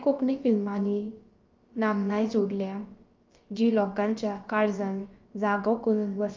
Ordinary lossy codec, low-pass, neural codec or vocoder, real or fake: Opus, 24 kbps; 7.2 kHz; codec, 16 kHz, about 1 kbps, DyCAST, with the encoder's durations; fake